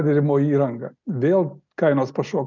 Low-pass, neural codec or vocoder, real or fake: 7.2 kHz; none; real